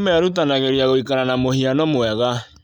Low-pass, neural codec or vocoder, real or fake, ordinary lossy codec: 19.8 kHz; none; real; none